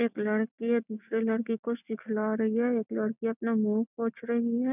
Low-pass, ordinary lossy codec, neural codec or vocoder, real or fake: 3.6 kHz; none; none; real